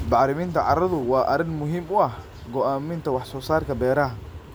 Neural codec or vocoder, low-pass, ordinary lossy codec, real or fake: none; none; none; real